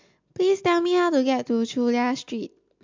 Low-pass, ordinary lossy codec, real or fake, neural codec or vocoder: 7.2 kHz; MP3, 64 kbps; real; none